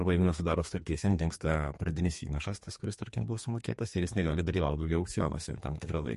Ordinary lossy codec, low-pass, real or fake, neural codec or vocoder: MP3, 48 kbps; 14.4 kHz; fake; codec, 32 kHz, 1.9 kbps, SNAC